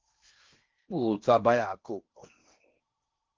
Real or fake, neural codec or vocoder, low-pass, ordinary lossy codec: fake; codec, 16 kHz in and 24 kHz out, 0.6 kbps, FocalCodec, streaming, 4096 codes; 7.2 kHz; Opus, 24 kbps